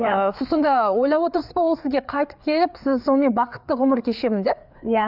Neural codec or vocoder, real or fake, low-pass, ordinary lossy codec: codec, 16 kHz, 4 kbps, FreqCodec, larger model; fake; 5.4 kHz; none